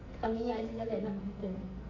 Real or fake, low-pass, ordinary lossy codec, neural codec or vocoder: fake; 7.2 kHz; none; codec, 44.1 kHz, 2.6 kbps, SNAC